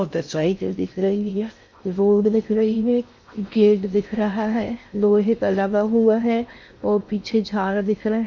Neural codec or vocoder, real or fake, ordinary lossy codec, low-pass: codec, 16 kHz in and 24 kHz out, 0.6 kbps, FocalCodec, streaming, 4096 codes; fake; MP3, 64 kbps; 7.2 kHz